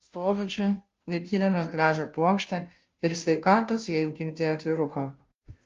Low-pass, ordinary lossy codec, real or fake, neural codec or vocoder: 7.2 kHz; Opus, 32 kbps; fake; codec, 16 kHz, 0.5 kbps, FunCodec, trained on Chinese and English, 25 frames a second